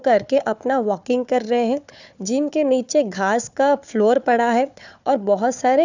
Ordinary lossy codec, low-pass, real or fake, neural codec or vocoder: none; 7.2 kHz; fake; codec, 16 kHz, 4 kbps, FunCodec, trained on Chinese and English, 50 frames a second